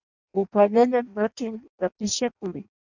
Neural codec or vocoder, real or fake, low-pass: codec, 16 kHz in and 24 kHz out, 0.6 kbps, FireRedTTS-2 codec; fake; 7.2 kHz